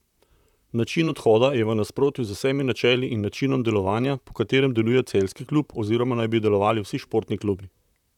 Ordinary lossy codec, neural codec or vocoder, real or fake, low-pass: none; vocoder, 44.1 kHz, 128 mel bands, Pupu-Vocoder; fake; 19.8 kHz